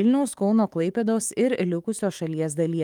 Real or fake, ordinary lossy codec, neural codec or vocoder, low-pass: fake; Opus, 32 kbps; autoencoder, 48 kHz, 128 numbers a frame, DAC-VAE, trained on Japanese speech; 19.8 kHz